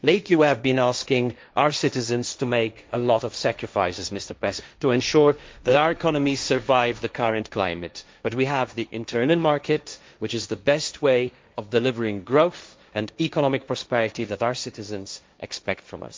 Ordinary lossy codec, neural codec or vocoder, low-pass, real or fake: none; codec, 16 kHz, 1.1 kbps, Voila-Tokenizer; none; fake